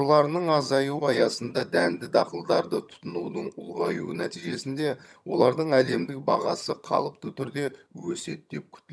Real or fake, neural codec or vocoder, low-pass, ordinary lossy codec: fake; vocoder, 22.05 kHz, 80 mel bands, HiFi-GAN; none; none